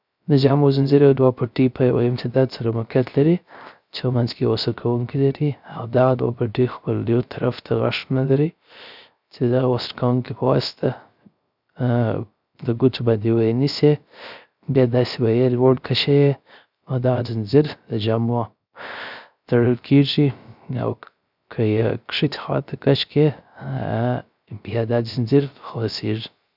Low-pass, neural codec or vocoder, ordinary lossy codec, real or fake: 5.4 kHz; codec, 16 kHz, 0.3 kbps, FocalCodec; none; fake